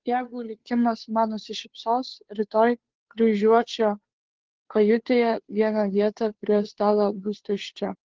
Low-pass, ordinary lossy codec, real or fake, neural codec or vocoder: 7.2 kHz; Opus, 16 kbps; fake; codec, 16 kHz, 2 kbps, FunCodec, trained on Chinese and English, 25 frames a second